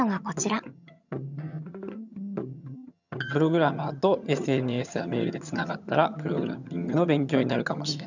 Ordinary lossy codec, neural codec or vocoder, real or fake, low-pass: none; vocoder, 22.05 kHz, 80 mel bands, HiFi-GAN; fake; 7.2 kHz